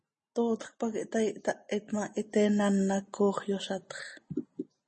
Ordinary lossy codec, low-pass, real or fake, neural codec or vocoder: MP3, 32 kbps; 10.8 kHz; real; none